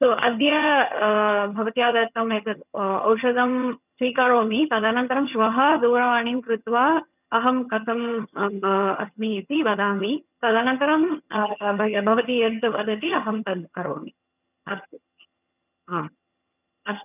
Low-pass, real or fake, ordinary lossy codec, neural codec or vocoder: 3.6 kHz; fake; none; vocoder, 22.05 kHz, 80 mel bands, HiFi-GAN